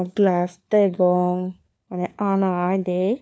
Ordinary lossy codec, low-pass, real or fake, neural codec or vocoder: none; none; fake; codec, 16 kHz, 4 kbps, FunCodec, trained on LibriTTS, 50 frames a second